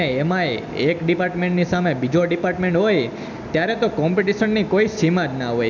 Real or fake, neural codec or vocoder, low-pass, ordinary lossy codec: real; none; 7.2 kHz; none